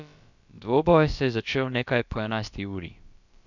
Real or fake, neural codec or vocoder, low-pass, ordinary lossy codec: fake; codec, 16 kHz, about 1 kbps, DyCAST, with the encoder's durations; 7.2 kHz; none